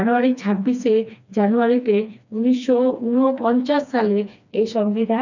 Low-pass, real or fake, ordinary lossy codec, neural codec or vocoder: 7.2 kHz; fake; none; codec, 16 kHz, 2 kbps, FreqCodec, smaller model